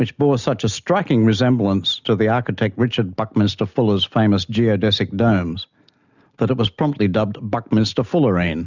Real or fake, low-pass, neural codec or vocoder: real; 7.2 kHz; none